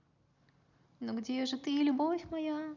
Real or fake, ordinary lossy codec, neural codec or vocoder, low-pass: real; none; none; 7.2 kHz